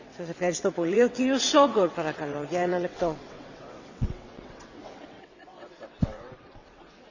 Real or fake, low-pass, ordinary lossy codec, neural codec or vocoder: fake; 7.2 kHz; none; vocoder, 22.05 kHz, 80 mel bands, WaveNeXt